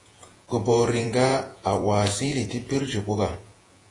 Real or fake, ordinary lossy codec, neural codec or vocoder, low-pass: fake; AAC, 32 kbps; vocoder, 48 kHz, 128 mel bands, Vocos; 10.8 kHz